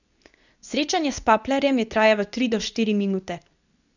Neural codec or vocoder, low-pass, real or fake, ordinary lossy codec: codec, 24 kHz, 0.9 kbps, WavTokenizer, medium speech release version 2; 7.2 kHz; fake; none